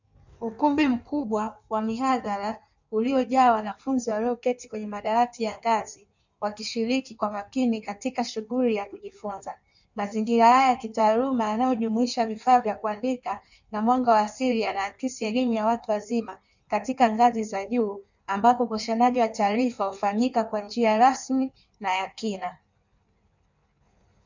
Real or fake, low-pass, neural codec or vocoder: fake; 7.2 kHz; codec, 16 kHz in and 24 kHz out, 1.1 kbps, FireRedTTS-2 codec